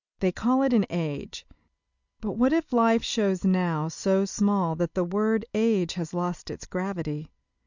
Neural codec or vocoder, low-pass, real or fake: none; 7.2 kHz; real